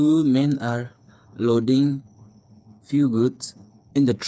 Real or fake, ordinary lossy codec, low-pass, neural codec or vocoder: fake; none; none; codec, 16 kHz, 4 kbps, FreqCodec, smaller model